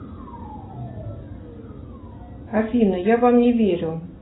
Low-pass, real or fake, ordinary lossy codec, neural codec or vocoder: 7.2 kHz; real; AAC, 16 kbps; none